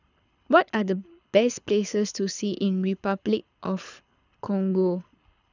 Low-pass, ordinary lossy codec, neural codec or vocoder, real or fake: 7.2 kHz; none; codec, 24 kHz, 6 kbps, HILCodec; fake